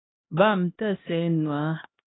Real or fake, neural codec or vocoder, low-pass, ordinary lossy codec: fake; codec, 16 kHz, 1 kbps, X-Codec, HuBERT features, trained on LibriSpeech; 7.2 kHz; AAC, 16 kbps